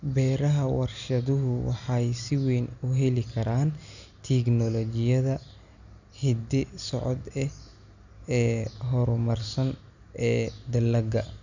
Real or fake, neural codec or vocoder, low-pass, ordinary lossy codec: real; none; 7.2 kHz; none